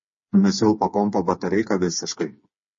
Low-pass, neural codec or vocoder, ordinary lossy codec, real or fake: 7.2 kHz; codec, 16 kHz, 4 kbps, FreqCodec, smaller model; MP3, 32 kbps; fake